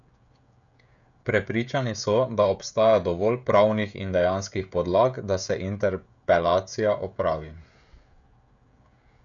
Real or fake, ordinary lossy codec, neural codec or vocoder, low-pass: fake; none; codec, 16 kHz, 16 kbps, FreqCodec, smaller model; 7.2 kHz